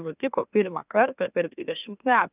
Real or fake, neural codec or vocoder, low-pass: fake; autoencoder, 44.1 kHz, a latent of 192 numbers a frame, MeloTTS; 3.6 kHz